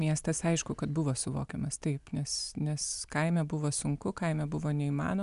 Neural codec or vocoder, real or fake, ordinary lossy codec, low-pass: none; real; MP3, 96 kbps; 10.8 kHz